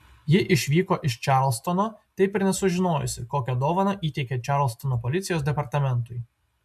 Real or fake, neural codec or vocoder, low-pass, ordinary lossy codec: real; none; 14.4 kHz; MP3, 96 kbps